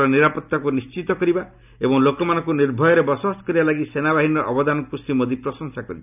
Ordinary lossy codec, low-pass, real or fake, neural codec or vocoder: none; 3.6 kHz; real; none